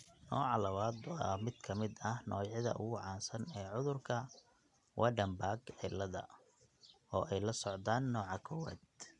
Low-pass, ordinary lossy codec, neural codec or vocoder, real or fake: 10.8 kHz; none; none; real